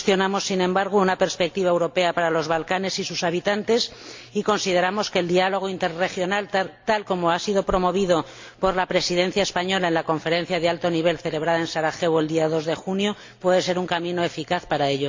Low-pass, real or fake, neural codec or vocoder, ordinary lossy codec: 7.2 kHz; real; none; MP3, 48 kbps